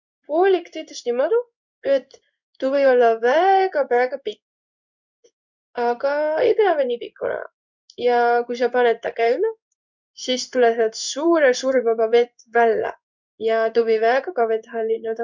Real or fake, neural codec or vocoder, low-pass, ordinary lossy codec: fake; codec, 16 kHz in and 24 kHz out, 1 kbps, XY-Tokenizer; 7.2 kHz; none